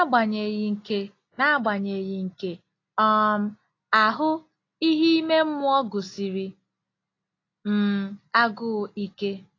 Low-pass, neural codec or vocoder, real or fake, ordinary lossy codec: 7.2 kHz; none; real; AAC, 32 kbps